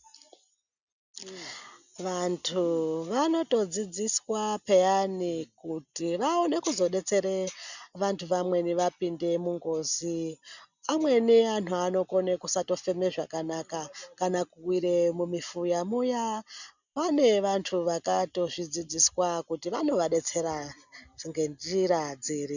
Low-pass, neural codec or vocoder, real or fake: 7.2 kHz; none; real